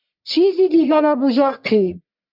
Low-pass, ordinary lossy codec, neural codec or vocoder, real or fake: 5.4 kHz; MP3, 48 kbps; codec, 44.1 kHz, 1.7 kbps, Pupu-Codec; fake